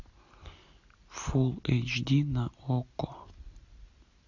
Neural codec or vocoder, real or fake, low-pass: none; real; 7.2 kHz